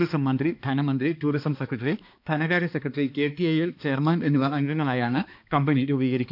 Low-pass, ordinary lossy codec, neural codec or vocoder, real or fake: 5.4 kHz; none; codec, 16 kHz, 2 kbps, X-Codec, HuBERT features, trained on balanced general audio; fake